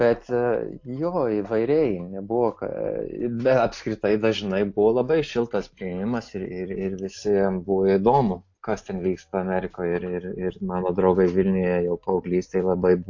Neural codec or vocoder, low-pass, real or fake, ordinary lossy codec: none; 7.2 kHz; real; AAC, 48 kbps